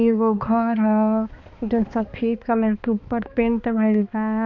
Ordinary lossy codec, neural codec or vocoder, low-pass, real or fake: AAC, 48 kbps; codec, 16 kHz, 2 kbps, X-Codec, HuBERT features, trained on balanced general audio; 7.2 kHz; fake